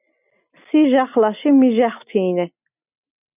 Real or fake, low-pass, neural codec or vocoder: real; 3.6 kHz; none